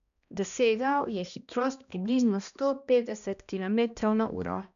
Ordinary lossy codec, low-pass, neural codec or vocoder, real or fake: none; 7.2 kHz; codec, 16 kHz, 1 kbps, X-Codec, HuBERT features, trained on balanced general audio; fake